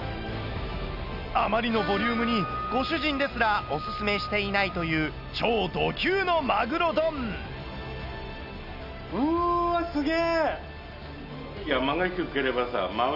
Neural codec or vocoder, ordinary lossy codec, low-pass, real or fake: none; none; 5.4 kHz; real